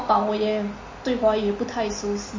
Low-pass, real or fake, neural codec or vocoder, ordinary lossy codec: 7.2 kHz; real; none; MP3, 32 kbps